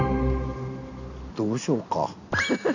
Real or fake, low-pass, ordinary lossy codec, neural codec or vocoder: real; 7.2 kHz; none; none